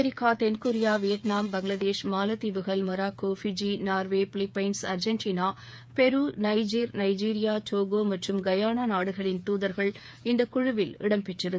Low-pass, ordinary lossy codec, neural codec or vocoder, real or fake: none; none; codec, 16 kHz, 8 kbps, FreqCodec, smaller model; fake